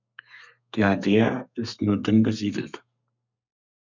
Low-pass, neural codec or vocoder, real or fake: 7.2 kHz; codec, 32 kHz, 1.9 kbps, SNAC; fake